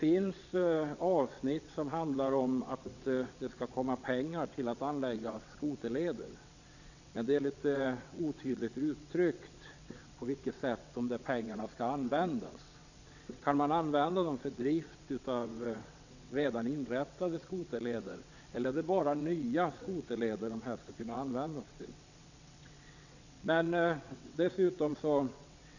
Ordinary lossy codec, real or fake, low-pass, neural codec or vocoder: none; fake; 7.2 kHz; vocoder, 22.05 kHz, 80 mel bands, WaveNeXt